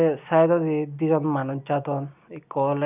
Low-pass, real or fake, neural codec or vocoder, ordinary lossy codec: 3.6 kHz; real; none; none